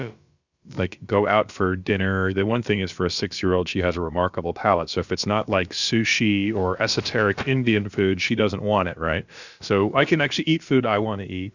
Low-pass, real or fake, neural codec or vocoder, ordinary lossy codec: 7.2 kHz; fake; codec, 16 kHz, about 1 kbps, DyCAST, with the encoder's durations; Opus, 64 kbps